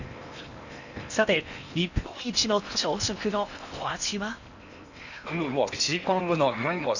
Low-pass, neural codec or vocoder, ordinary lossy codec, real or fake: 7.2 kHz; codec, 16 kHz in and 24 kHz out, 0.8 kbps, FocalCodec, streaming, 65536 codes; none; fake